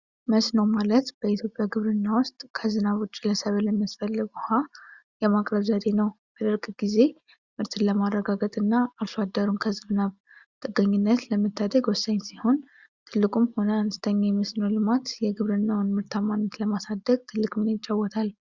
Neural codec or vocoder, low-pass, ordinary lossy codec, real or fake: none; 7.2 kHz; Opus, 64 kbps; real